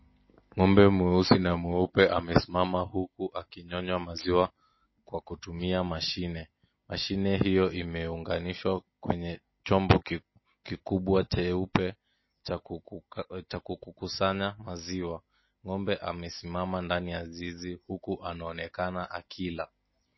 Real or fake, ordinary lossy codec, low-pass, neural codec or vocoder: real; MP3, 24 kbps; 7.2 kHz; none